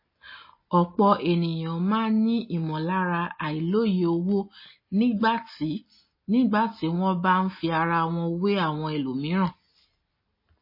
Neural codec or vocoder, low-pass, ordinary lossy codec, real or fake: none; 5.4 kHz; MP3, 24 kbps; real